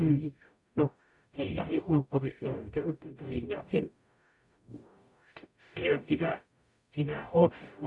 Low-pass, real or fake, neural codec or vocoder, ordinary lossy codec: 10.8 kHz; fake; codec, 44.1 kHz, 0.9 kbps, DAC; none